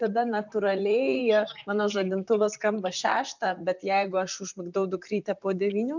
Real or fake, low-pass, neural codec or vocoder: fake; 7.2 kHz; vocoder, 44.1 kHz, 128 mel bands, Pupu-Vocoder